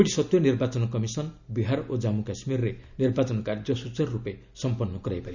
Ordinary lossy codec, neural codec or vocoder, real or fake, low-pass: none; none; real; 7.2 kHz